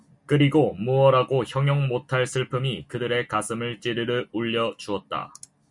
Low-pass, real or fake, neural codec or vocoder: 10.8 kHz; real; none